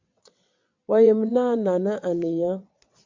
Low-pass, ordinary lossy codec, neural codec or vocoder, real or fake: 7.2 kHz; MP3, 64 kbps; vocoder, 22.05 kHz, 80 mel bands, WaveNeXt; fake